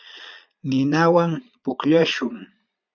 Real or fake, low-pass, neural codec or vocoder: fake; 7.2 kHz; vocoder, 22.05 kHz, 80 mel bands, Vocos